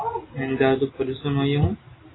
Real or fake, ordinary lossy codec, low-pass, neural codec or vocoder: real; AAC, 16 kbps; 7.2 kHz; none